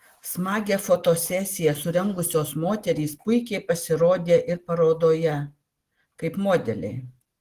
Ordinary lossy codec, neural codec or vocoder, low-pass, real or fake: Opus, 16 kbps; none; 14.4 kHz; real